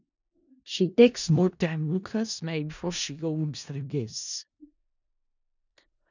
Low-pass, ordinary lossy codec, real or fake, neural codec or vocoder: 7.2 kHz; none; fake; codec, 16 kHz in and 24 kHz out, 0.4 kbps, LongCat-Audio-Codec, four codebook decoder